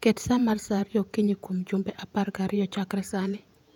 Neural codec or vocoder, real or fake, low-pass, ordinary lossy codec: vocoder, 48 kHz, 128 mel bands, Vocos; fake; 19.8 kHz; none